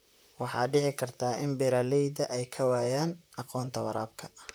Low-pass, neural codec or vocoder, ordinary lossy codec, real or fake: none; vocoder, 44.1 kHz, 128 mel bands, Pupu-Vocoder; none; fake